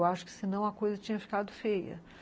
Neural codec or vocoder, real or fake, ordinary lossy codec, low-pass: none; real; none; none